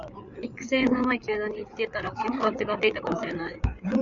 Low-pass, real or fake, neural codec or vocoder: 7.2 kHz; fake; codec, 16 kHz, 8 kbps, FreqCodec, larger model